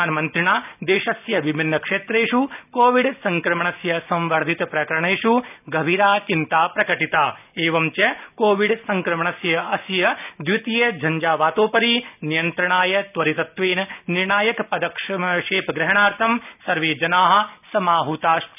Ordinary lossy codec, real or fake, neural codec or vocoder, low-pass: none; real; none; 3.6 kHz